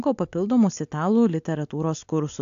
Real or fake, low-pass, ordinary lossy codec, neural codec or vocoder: real; 7.2 kHz; AAC, 64 kbps; none